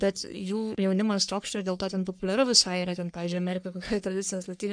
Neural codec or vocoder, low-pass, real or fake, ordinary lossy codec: codec, 44.1 kHz, 3.4 kbps, Pupu-Codec; 9.9 kHz; fake; MP3, 96 kbps